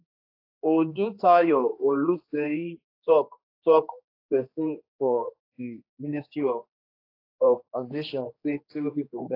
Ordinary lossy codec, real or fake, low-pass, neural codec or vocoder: AAC, 32 kbps; fake; 5.4 kHz; codec, 16 kHz, 2 kbps, X-Codec, HuBERT features, trained on general audio